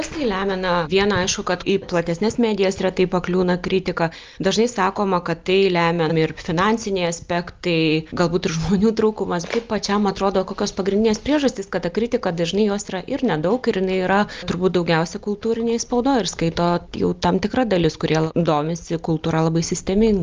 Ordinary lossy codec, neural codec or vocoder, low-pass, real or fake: Opus, 24 kbps; none; 7.2 kHz; real